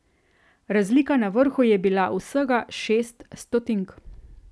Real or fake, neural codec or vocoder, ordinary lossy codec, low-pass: real; none; none; none